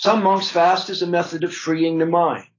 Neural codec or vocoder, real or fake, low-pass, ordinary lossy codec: none; real; 7.2 kHz; AAC, 32 kbps